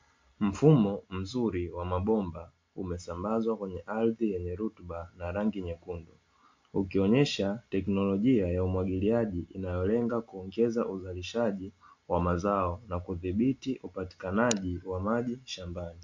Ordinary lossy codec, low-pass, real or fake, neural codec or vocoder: MP3, 48 kbps; 7.2 kHz; real; none